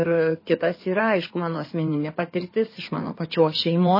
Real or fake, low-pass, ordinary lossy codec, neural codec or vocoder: fake; 5.4 kHz; MP3, 24 kbps; codec, 16 kHz in and 24 kHz out, 2.2 kbps, FireRedTTS-2 codec